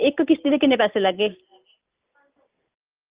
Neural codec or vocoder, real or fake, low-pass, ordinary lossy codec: none; real; 3.6 kHz; Opus, 32 kbps